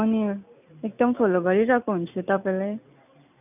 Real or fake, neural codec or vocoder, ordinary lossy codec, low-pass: real; none; none; 3.6 kHz